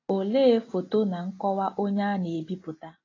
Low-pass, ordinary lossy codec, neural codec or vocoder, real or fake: 7.2 kHz; AAC, 32 kbps; none; real